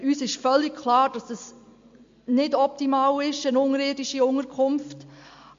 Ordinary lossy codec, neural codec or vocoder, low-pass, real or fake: MP3, 48 kbps; none; 7.2 kHz; real